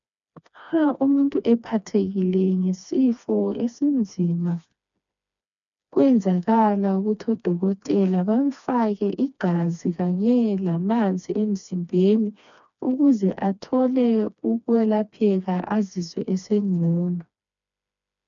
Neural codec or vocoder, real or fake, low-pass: codec, 16 kHz, 2 kbps, FreqCodec, smaller model; fake; 7.2 kHz